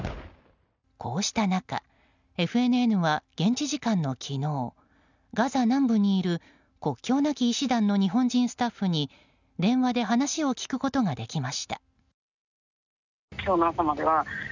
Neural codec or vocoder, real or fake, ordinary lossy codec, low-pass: none; real; none; 7.2 kHz